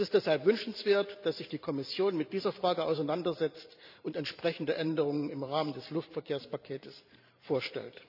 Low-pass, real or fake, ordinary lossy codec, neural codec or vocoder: 5.4 kHz; fake; none; vocoder, 44.1 kHz, 128 mel bands every 512 samples, BigVGAN v2